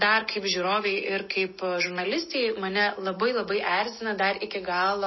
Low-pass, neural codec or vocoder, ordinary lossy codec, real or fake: 7.2 kHz; none; MP3, 24 kbps; real